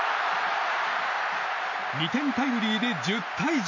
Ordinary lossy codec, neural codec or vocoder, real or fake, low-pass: none; none; real; 7.2 kHz